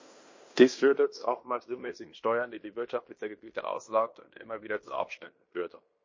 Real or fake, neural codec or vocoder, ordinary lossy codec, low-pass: fake; codec, 16 kHz in and 24 kHz out, 0.9 kbps, LongCat-Audio-Codec, fine tuned four codebook decoder; MP3, 32 kbps; 7.2 kHz